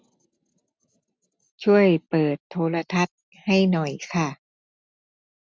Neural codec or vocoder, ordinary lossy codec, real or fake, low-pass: none; none; real; none